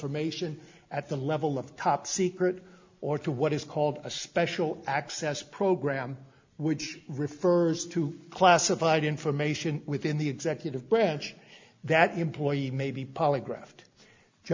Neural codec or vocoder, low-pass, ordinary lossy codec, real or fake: none; 7.2 kHz; MP3, 64 kbps; real